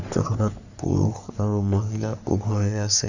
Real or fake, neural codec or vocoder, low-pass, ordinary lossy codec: fake; codec, 44.1 kHz, 3.4 kbps, Pupu-Codec; 7.2 kHz; none